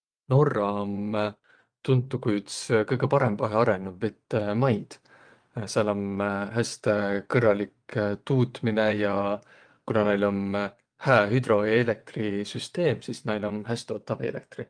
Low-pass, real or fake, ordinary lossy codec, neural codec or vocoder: 9.9 kHz; fake; Opus, 32 kbps; vocoder, 22.05 kHz, 80 mel bands, WaveNeXt